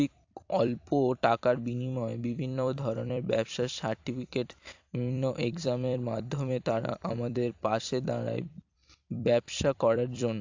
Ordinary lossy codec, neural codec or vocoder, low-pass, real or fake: AAC, 48 kbps; vocoder, 44.1 kHz, 128 mel bands every 256 samples, BigVGAN v2; 7.2 kHz; fake